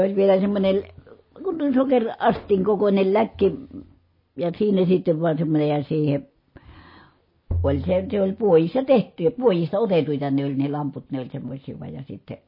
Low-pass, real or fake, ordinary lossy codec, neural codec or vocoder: 5.4 kHz; real; MP3, 24 kbps; none